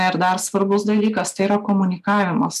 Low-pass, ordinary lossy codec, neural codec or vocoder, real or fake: 14.4 kHz; MP3, 96 kbps; none; real